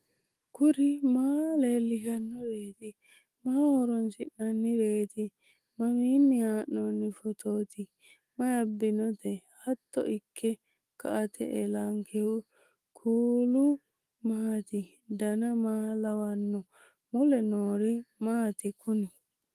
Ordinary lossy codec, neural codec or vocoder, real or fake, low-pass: Opus, 24 kbps; autoencoder, 48 kHz, 128 numbers a frame, DAC-VAE, trained on Japanese speech; fake; 14.4 kHz